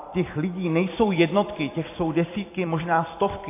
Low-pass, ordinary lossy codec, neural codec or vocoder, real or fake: 3.6 kHz; AAC, 24 kbps; vocoder, 44.1 kHz, 128 mel bands every 256 samples, BigVGAN v2; fake